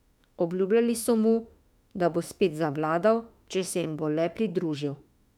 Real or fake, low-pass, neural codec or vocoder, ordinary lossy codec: fake; 19.8 kHz; autoencoder, 48 kHz, 32 numbers a frame, DAC-VAE, trained on Japanese speech; none